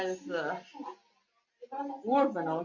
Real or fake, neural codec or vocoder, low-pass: real; none; 7.2 kHz